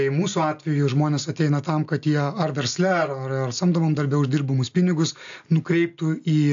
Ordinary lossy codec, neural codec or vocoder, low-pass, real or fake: AAC, 64 kbps; none; 7.2 kHz; real